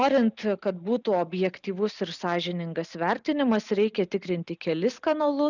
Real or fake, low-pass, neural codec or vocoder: real; 7.2 kHz; none